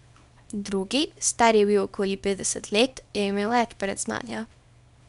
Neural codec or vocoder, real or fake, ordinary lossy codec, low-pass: codec, 24 kHz, 0.9 kbps, WavTokenizer, small release; fake; none; 10.8 kHz